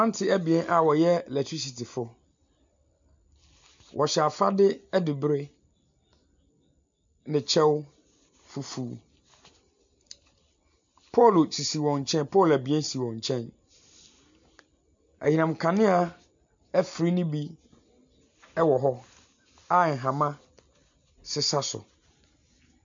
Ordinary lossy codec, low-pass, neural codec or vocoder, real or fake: MP3, 64 kbps; 7.2 kHz; none; real